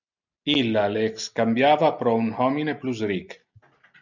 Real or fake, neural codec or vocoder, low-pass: real; none; 7.2 kHz